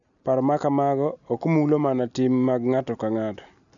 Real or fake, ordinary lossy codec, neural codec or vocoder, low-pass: real; none; none; 7.2 kHz